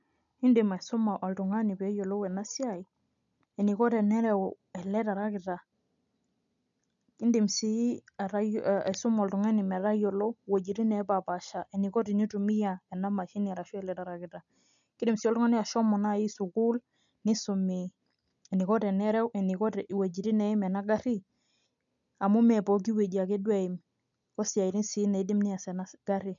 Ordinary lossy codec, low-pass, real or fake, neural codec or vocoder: none; 7.2 kHz; real; none